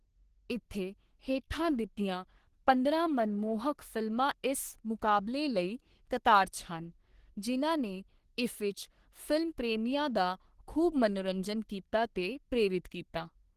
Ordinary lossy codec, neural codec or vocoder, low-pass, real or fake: Opus, 16 kbps; codec, 44.1 kHz, 3.4 kbps, Pupu-Codec; 14.4 kHz; fake